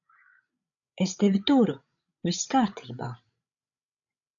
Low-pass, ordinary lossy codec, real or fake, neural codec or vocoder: 7.2 kHz; AAC, 64 kbps; fake; codec, 16 kHz, 16 kbps, FreqCodec, larger model